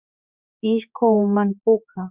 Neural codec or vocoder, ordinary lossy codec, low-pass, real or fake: codec, 16 kHz, 4 kbps, X-Codec, HuBERT features, trained on general audio; Opus, 64 kbps; 3.6 kHz; fake